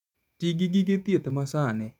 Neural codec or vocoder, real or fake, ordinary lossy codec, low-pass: vocoder, 48 kHz, 128 mel bands, Vocos; fake; none; 19.8 kHz